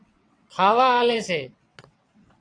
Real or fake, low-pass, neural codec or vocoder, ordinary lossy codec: fake; 9.9 kHz; vocoder, 22.05 kHz, 80 mel bands, WaveNeXt; AAC, 48 kbps